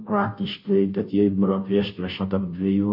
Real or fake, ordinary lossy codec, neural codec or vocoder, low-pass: fake; MP3, 32 kbps; codec, 16 kHz, 0.5 kbps, FunCodec, trained on Chinese and English, 25 frames a second; 5.4 kHz